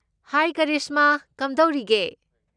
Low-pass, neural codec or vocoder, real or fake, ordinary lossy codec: 9.9 kHz; none; real; none